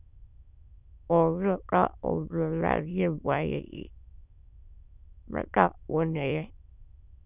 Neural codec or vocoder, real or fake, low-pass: autoencoder, 22.05 kHz, a latent of 192 numbers a frame, VITS, trained on many speakers; fake; 3.6 kHz